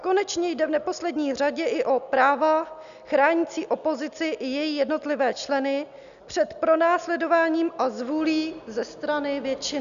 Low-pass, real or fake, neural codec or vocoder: 7.2 kHz; real; none